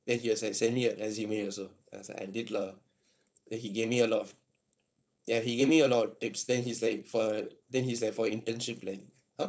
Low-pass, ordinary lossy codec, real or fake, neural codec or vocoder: none; none; fake; codec, 16 kHz, 4.8 kbps, FACodec